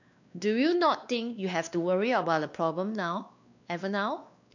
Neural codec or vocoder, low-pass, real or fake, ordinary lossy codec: codec, 16 kHz, 2 kbps, X-Codec, WavLM features, trained on Multilingual LibriSpeech; 7.2 kHz; fake; none